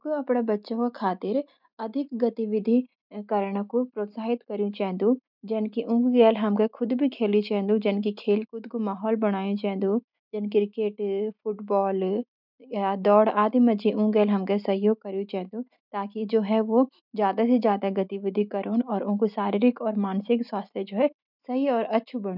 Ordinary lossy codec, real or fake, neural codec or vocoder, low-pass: none; real; none; 5.4 kHz